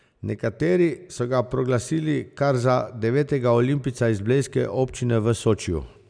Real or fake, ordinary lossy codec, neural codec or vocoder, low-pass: real; none; none; 9.9 kHz